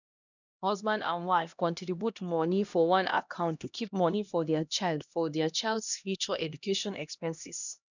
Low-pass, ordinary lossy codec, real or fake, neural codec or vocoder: 7.2 kHz; none; fake; codec, 16 kHz, 1 kbps, X-Codec, HuBERT features, trained on LibriSpeech